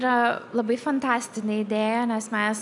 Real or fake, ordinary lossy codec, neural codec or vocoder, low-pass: real; MP3, 96 kbps; none; 10.8 kHz